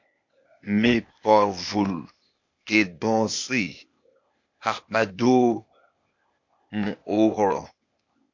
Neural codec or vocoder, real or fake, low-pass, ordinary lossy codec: codec, 16 kHz, 0.8 kbps, ZipCodec; fake; 7.2 kHz; MP3, 48 kbps